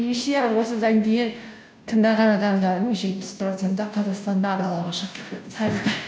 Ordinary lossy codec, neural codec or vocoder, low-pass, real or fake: none; codec, 16 kHz, 0.5 kbps, FunCodec, trained on Chinese and English, 25 frames a second; none; fake